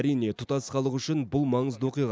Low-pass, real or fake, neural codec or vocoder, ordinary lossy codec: none; real; none; none